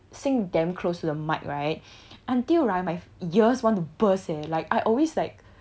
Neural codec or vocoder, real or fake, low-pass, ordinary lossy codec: none; real; none; none